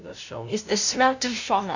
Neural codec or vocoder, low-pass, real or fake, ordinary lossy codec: codec, 16 kHz, 0.5 kbps, FunCodec, trained on LibriTTS, 25 frames a second; 7.2 kHz; fake; none